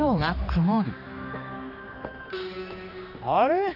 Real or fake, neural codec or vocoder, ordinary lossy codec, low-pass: fake; codec, 16 kHz, 2 kbps, X-Codec, HuBERT features, trained on general audio; none; 5.4 kHz